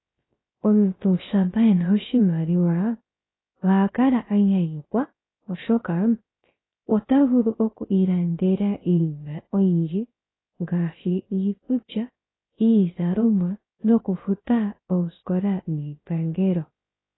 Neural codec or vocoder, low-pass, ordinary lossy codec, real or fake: codec, 16 kHz, 0.3 kbps, FocalCodec; 7.2 kHz; AAC, 16 kbps; fake